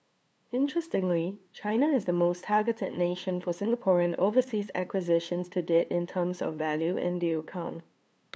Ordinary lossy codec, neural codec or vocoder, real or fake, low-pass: none; codec, 16 kHz, 2 kbps, FunCodec, trained on LibriTTS, 25 frames a second; fake; none